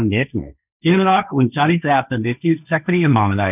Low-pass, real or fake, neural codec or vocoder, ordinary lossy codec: 3.6 kHz; fake; codec, 16 kHz, 1.1 kbps, Voila-Tokenizer; none